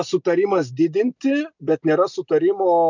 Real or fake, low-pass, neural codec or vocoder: real; 7.2 kHz; none